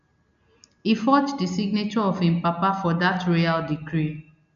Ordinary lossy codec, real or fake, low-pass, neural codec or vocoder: none; real; 7.2 kHz; none